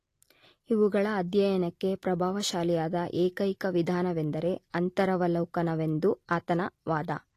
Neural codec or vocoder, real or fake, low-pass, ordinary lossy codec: none; real; 14.4 kHz; AAC, 48 kbps